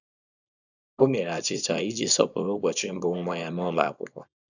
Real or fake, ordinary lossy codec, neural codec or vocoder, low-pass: fake; none; codec, 24 kHz, 0.9 kbps, WavTokenizer, small release; 7.2 kHz